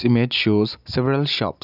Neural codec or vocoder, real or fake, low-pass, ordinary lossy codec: none; real; 5.4 kHz; none